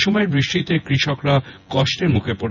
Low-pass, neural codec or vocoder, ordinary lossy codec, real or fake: 7.2 kHz; vocoder, 24 kHz, 100 mel bands, Vocos; none; fake